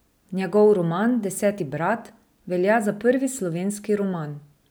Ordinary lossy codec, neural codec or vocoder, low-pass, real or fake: none; none; none; real